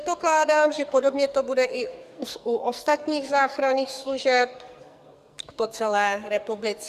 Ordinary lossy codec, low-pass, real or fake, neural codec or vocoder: Opus, 64 kbps; 14.4 kHz; fake; codec, 44.1 kHz, 2.6 kbps, SNAC